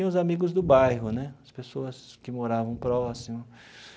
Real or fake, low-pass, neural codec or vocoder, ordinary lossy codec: real; none; none; none